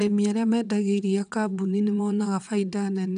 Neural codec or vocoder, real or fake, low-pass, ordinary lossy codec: vocoder, 22.05 kHz, 80 mel bands, WaveNeXt; fake; 9.9 kHz; none